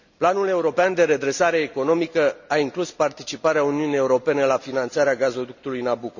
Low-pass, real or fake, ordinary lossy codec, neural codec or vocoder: 7.2 kHz; real; none; none